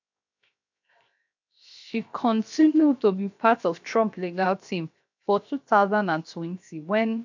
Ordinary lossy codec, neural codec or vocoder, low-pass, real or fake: MP3, 64 kbps; codec, 16 kHz, 0.7 kbps, FocalCodec; 7.2 kHz; fake